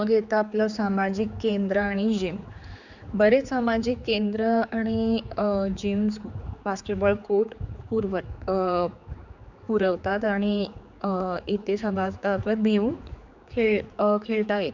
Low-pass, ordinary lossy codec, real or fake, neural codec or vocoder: 7.2 kHz; none; fake; codec, 16 kHz, 4 kbps, X-Codec, HuBERT features, trained on general audio